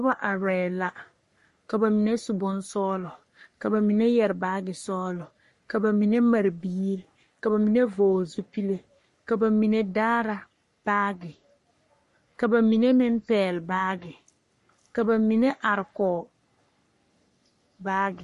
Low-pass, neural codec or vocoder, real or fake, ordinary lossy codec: 14.4 kHz; codec, 44.1 kHz, 3.4 kbps, Pupu-Codec; fake; MP3, 48 kbps